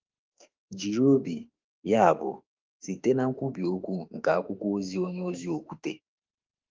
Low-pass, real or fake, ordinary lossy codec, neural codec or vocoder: 7.2 kHz; fake; Opus, 32 kbps; autoencoder, 48 kHz, 32 numbers a frame, DAC-VAE, trained on Japanese speech